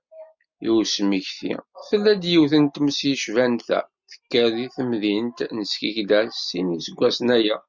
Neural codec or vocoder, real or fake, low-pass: none; real; 7.2 kHz